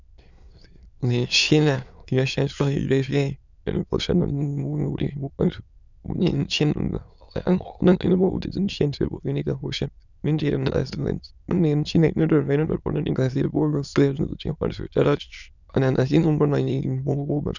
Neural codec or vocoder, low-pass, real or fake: autoencoder, 22.05 kHz, a latent of 192 numbers a frame, VITS, trained on many speakers; 7.2 kHz; fake